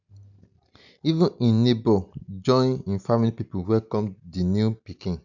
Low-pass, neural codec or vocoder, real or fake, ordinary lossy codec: 7.2 kHz; none; real; none